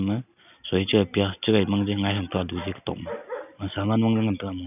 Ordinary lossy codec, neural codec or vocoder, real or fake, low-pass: none; none; real; 3.6 kHz